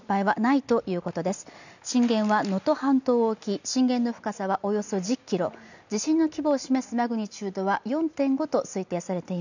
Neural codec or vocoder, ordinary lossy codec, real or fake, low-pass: none; none; real; 7.2 kHz